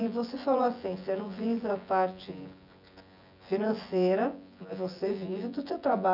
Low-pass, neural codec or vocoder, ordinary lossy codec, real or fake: 5.4 kHz; vocoder, 24 kHz, 100 mel bands, Vocos; none; fake